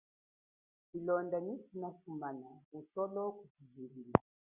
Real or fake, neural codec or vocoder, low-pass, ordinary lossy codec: real; none; 3.6 kHz; Opus, 64 kbps